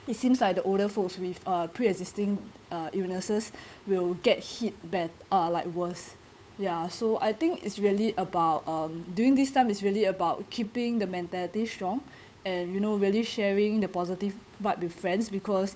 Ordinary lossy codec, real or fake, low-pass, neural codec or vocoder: none; fake; none; codec, 16 kHz, 8 kbps, FunCodec, trained on Chinese and English, 25 frames a second